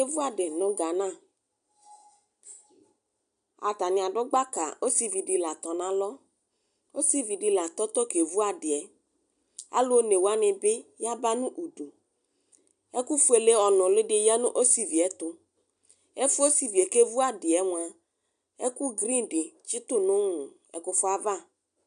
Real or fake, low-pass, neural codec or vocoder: real; 9.9 kHz; none